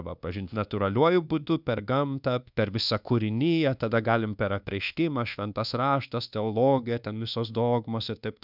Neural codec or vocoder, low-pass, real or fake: codec, 24 kHz, 1.2 kbps, DualCodec; 5.4 kHz; fake